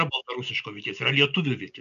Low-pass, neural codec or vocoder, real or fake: 7.2 kHz; none; real